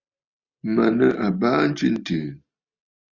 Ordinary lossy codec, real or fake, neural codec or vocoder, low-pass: Opus, 64 kbps; real; none; 7.2 kHz